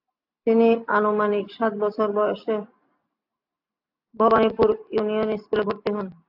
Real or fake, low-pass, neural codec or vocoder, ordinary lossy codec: real; 5.4 kHz; none; AAC, 48 kbps